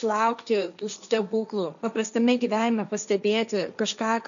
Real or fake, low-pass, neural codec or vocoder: fake; 7.2 kHz; codec, 16 kHz, 1.1 kbps, Voila-Tokenizer